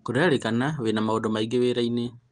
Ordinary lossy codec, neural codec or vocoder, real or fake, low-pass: Opus, 24 kbps; none; real; 9.9 kHz